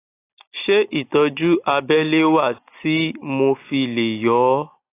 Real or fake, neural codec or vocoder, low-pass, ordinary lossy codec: real; none; 3.6 kHz; AAC, 24 kbps